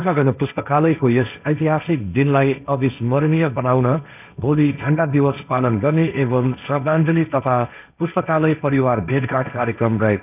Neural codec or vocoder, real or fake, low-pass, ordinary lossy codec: codec, 16 kHz, 1.1 kbps, Voila-Tokenizer; fake; 3.6 kHz; none